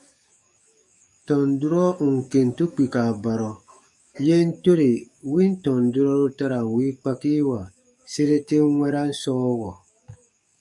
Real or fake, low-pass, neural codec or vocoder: fake; 10.8 kHz; codec, 44.1 kHz, 7.8 kbps, DAC